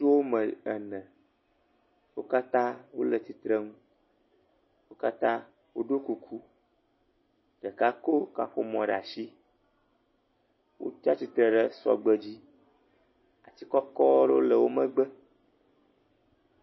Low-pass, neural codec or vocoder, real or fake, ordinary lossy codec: 7.2 kHz; none; real; MP3, 24 kbps